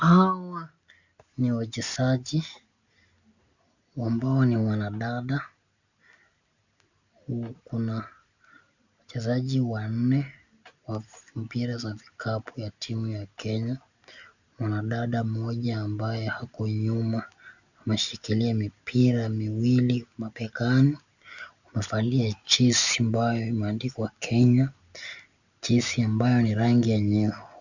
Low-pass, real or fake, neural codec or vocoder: 7.2 kHz; real; none